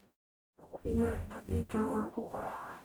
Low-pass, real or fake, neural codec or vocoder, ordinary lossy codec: none; fake; codec, 44.1 kHz, 0.9 kbps, DAC; none